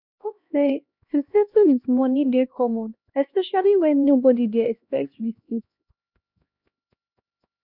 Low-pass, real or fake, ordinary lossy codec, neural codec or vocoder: 5.4 kHz; fake; none; codec, 16 kHz, 1 kbps, X-Codec, HuBERT features, trained on LibriSpeech